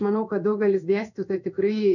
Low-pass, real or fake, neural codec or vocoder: 7.2 kHz; fake; codec, 16 kHz in and 24 kHz out, 1 kbps, XY-Tokenizer